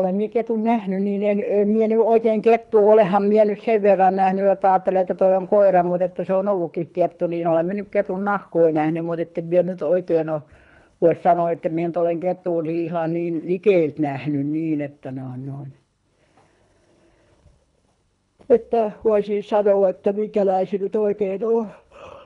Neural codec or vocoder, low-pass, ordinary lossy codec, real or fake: codec, 24 kHz, 3 kbps, HILCodec; 10.8 kHz; none; fake